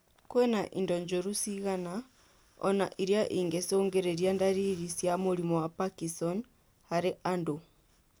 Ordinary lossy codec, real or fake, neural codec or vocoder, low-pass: none; real; none; none